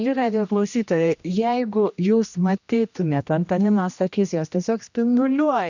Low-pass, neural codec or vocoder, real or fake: 7.2 kHz; codec, 16 kHz, 1 kbps, X-Codec, HuBERT features, trained on general audio; fake